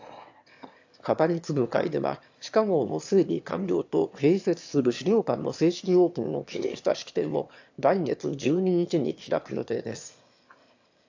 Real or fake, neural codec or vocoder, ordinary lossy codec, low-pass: fake; autoencoder, 22.05 kHz, a latent of 192 numbers a frame, VITS, trained on one speaker; AAC, 48 kbps; 7.2 kHz